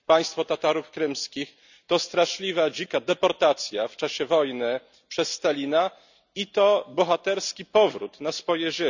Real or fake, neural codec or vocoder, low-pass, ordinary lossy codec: real; none; 7.2 kHz; none